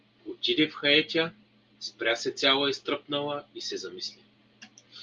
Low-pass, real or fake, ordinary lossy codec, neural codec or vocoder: 7.2 kHz; real; Opus, 32 kbps; none